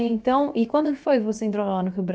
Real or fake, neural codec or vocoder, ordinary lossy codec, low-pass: fake; codec, 16 kHz, about 1 kbps, DyCAST, with the encoder's durations; none; none